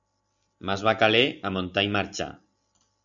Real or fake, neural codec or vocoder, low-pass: real; none; 7.2 kHz